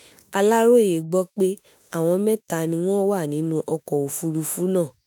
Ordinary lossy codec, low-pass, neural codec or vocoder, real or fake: none; none; autoencoder, 48 kHz, 32 numbers a frame, DAC-VAE, trained on Japanese speech; fake